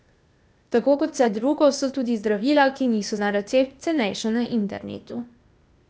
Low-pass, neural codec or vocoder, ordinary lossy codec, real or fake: none; codec, 16 kHz, 0.8 kbps, ZipCodec; none; fake